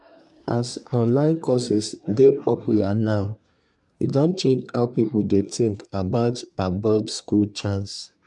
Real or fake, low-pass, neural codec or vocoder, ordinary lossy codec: fake; 10.8 kHz; codec, 24 kHz, 1 kbps, SNAC; none